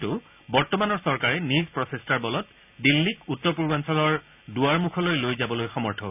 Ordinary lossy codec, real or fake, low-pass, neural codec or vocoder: none; real; 3.6 kHz; none